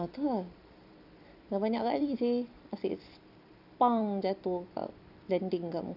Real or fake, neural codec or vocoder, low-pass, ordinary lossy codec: fake; vocoder, 44.1 kHz, 128 mel bands every 256 samples, BigVGAN v2; 5.4 kHz; none